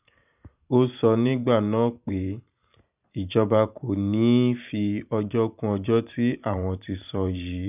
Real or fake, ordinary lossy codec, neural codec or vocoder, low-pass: fake; none; vocoder, 44.1 kHz, 128 mel bands every 512 samples, BigVGAN v2; 3.6 kHz